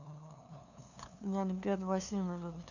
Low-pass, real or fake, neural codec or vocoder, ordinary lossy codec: 7.2 kHz; fake; codec, 16 kHz, 2 kbps, FunCodec, trained on LibriTTS, 25 frames a second; none